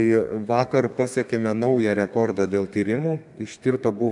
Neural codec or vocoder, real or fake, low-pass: codec, 32 kHz, 1.9 kbps, SNAC; fake; 10.8 kHz